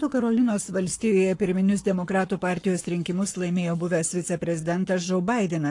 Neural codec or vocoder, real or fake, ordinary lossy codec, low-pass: codec, 44.1 kHz, 7.8 kbps, Pupu-Codec; fake; AAC, 48 kbps; 10.8 kHz